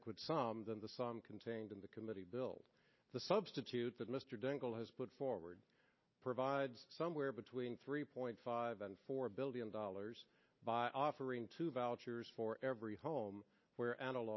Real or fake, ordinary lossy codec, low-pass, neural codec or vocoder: real; MP3, 24 kbps; 7.2 kHz; none